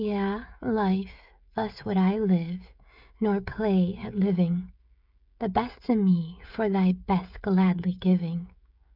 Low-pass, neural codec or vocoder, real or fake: 5.4 kHz; codec, 16 kHz, 16 kbps, FreqCodec, smaller model; fake